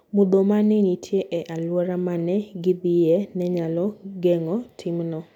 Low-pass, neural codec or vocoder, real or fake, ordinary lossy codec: 19.8 kHz; none; real; none